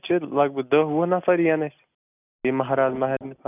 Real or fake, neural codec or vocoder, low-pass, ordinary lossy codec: real; none; 3.6 kHz; none